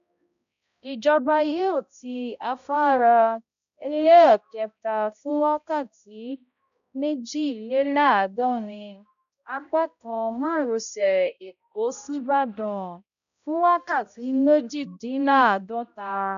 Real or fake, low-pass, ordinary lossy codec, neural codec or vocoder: fake; 7.2 kHz; none; codec, 16 kHz, 0.5 kbps, X-Codec, HuBERT features, trained on balanced general audio